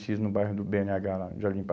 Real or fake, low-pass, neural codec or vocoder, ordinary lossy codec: real; none; none; none